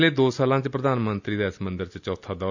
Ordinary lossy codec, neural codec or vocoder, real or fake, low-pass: none; none; real; 7.2 kHz